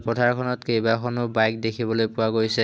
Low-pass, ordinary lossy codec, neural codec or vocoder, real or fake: none; none; none; real